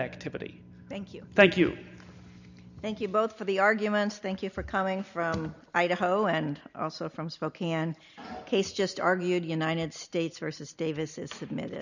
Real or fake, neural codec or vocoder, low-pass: real; none; 7.2 kHz